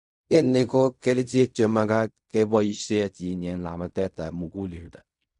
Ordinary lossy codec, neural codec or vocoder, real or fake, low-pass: none; codec, 16 kHz in and 24 kHz out, 0.4 kbps, LongCat-Audio-Codec, fine tuned four codebook decoder; fake; 10.8 kHz